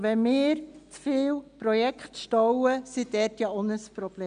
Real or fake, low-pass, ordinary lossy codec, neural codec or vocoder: real; 9.9 kHz; none; none